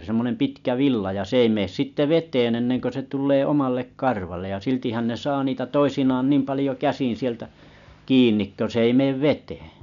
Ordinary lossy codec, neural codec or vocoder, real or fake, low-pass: none; none; real; 7.2 kHz